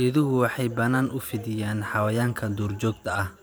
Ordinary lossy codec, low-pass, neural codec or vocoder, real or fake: none; none; vocoder, 44.1 kHz, 128 mel bands every 512 samples, BigVGAN v2; fake